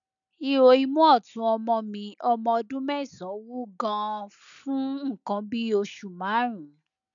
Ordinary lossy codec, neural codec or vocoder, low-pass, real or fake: none; none; 7.2 kHz; real